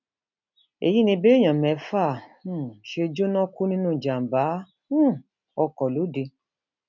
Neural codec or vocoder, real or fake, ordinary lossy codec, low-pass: none; real; none; 7.2 kHz